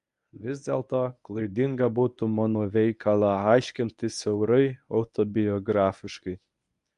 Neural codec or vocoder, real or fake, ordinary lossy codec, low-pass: codec, 24 kHz, 0.9 kbps, WavTokenizer, medium speech release version 1; fake; AAC, 96 kbps; 10.8 kHz